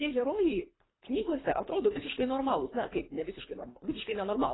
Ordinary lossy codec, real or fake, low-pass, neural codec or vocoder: AAC, 16 kbps; fake; 7.2 kHz; codec, 24 kHz, 3 kbps, HILCodec